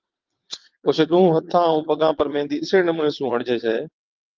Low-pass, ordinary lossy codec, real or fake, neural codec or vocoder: 7.2 kHz; Opus, 24 kbps; fake; vocoder, 22.05 kHz, 80 mel bands, WaveNeXt